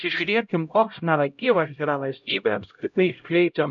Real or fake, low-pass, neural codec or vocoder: fake; 7.2 kHz; codec, 16 kHz, 0.5 kbps, X-Codec, HuBERT features, trained on LibriSpeech